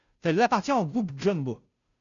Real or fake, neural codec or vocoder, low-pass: fake; codec, 16 kHz, 0.5 kbps, FunCodec, trained on Chinese and English, 25 frames a second; 7.2 kHz